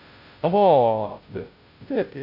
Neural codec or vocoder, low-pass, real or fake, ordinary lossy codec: codec, 16 kHz, 0.5 kbps, FunCodec, trained on Chinese and English, 25 frames a second; 5.4 kHz; fake; none